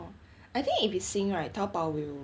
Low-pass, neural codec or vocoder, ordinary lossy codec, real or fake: none; none; none; real